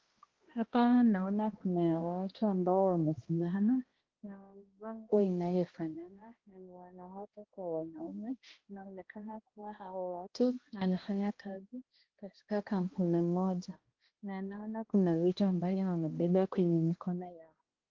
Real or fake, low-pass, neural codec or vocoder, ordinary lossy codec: fake; 7.2 kHz; codec, 16 kHz, 1 kbps, X-Codec, HuBERT features, trained on balanced general audio; Opus, 16 kbps